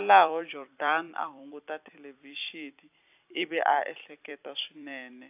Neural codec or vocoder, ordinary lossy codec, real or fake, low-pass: vocoder, 44.1 kHz, 128 mel bands every 256 samples, BigVGAN v2; AAC, 32 kbps; fake; 3.6 kHz